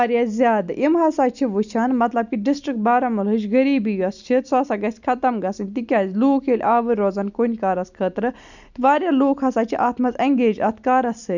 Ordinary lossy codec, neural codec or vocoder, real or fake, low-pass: none; none; real; 7.2 kHz